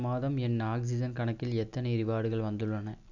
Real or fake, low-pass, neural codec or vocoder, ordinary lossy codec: real; 7.2 kHz; none; none